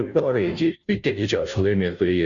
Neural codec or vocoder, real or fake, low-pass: codec, 16 kHz, 0.5 kbps, FunCodec, trained on Chinese and English, 25 frames a second; fake; 7.2 kHz